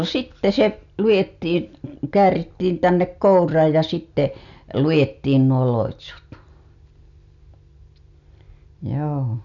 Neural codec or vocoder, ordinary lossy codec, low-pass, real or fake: none; none; 7.2 kHz; real